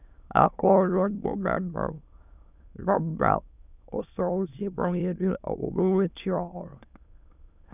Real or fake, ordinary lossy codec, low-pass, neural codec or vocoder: fake; none; 3.6 kHz; autoencoder, 22.05 kHz, a latent of 192 numbers a frame, VITS, trained on many speakers